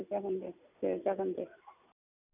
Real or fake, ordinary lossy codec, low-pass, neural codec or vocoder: real; none; 3.6 kHz; none